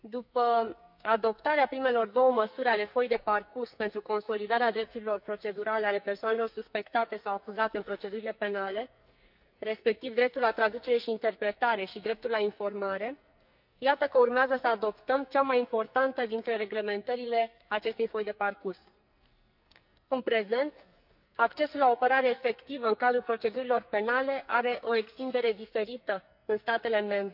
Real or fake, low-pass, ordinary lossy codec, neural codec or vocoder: fake; 5.4 kHz; none; codec, 44.1 kHz, 2.6 kbps, SNAC